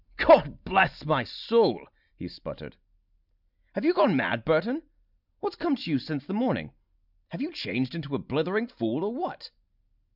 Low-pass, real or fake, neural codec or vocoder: 5.4 kHz; real; none